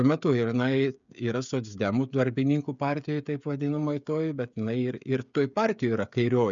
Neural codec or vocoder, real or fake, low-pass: codec, 16 kHz, 16 kbps, FreqCodec, smaller model; fake; 7.2 kHz